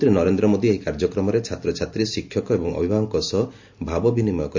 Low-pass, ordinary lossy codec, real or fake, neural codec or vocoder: 7.2 kHz; MP3, 48 kbps; real; none